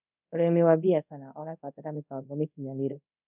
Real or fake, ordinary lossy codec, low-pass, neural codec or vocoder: fake; none; 3.6 kHz; codec, 24 kHz, 0.5 kbps, DualCodec